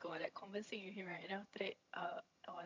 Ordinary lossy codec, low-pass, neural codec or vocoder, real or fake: MP3, 64 kbps; 7.2 kHz; vocoder, 22.05 kHz, 80 mel bands, HiFi-GAN; fake